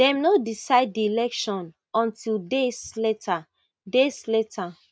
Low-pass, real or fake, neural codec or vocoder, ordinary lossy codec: none; real; none; none